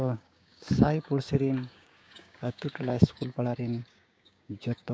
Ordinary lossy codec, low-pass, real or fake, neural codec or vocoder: none; none; fake; codec, 16 kHz, 6 kbps, DAC